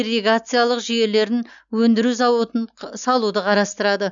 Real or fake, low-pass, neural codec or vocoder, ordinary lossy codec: real; 7.2 kHz; none; none